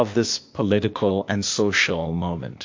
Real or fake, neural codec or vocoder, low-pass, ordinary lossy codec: fake; codec, 16 kHz, 0.8 kbps, ZipCodec; 7.2 kHz; MP3, 48 kbps